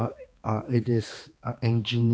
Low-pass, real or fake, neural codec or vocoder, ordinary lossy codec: none; fake; codec, 16 kHz, 2 kbps, X-Codec, HuBERT features, trained on balanced general audio; none